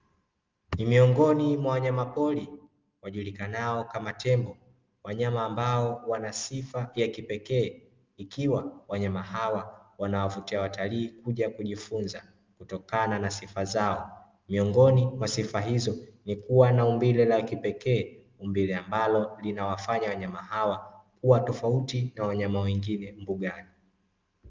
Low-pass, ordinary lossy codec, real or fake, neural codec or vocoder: 7.2 kHz; Opus, 24 kbps; real; none